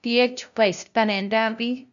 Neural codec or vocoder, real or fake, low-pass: codec, 16 kHz, 0.5 kbps, FunCodec, trained on LibriTTS, 25 frames a second; fake; 7.2 kHz